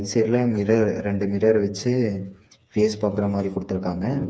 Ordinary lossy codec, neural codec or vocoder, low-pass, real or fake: none; codec, 16 kHz, 4 kbps, FreqCodec, smaller model; none; fake